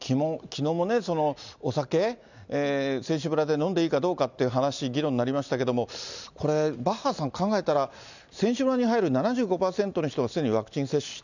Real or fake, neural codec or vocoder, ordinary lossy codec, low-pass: real; none; none; 7.2 kHz